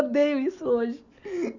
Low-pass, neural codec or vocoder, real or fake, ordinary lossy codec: 7.2 kHz; none; real; none